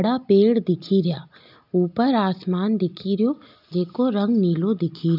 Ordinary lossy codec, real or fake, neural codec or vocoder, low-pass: none; real; none; 5.4 kHz